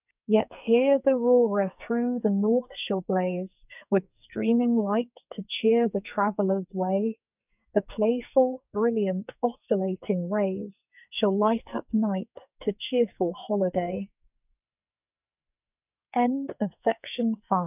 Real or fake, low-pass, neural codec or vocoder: fake; 3.6 kHz; codec, 44.1 kHz, 2.6 kbps, SNAC